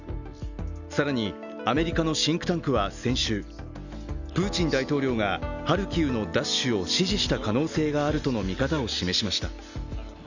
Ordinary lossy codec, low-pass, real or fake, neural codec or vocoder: none; 7.2 kHz; real; none